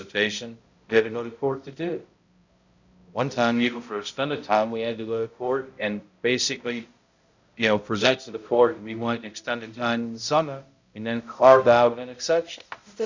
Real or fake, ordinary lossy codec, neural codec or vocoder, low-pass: fake; Opus, 64 kbps; codec, 16 kHz, 0.5 kbps, X-Codec, HuBERT features, trained on balanced general audio; 7.2 kHz